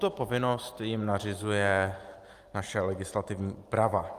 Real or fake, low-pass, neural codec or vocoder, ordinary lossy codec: fake; 14.4 kHz; vocoder, 44.1 kHz, 128 mel bands every 512 samples, BigVGAN v2; Opus, 32 kbps